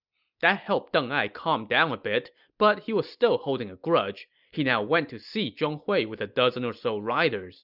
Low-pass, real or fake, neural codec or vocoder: 5.4 kHz; real; none